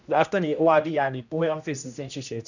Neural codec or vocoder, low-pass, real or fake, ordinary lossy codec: codec, 16 kHz, 1 kbps, X-Codec, HuBERT features, trained on general audio; 7.2 kHz; fake; none